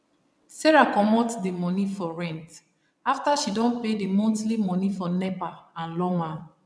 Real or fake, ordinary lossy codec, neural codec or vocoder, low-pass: fake; none; vocoder, 22.05 kHz, 80 mel bands, WaveNeXt; none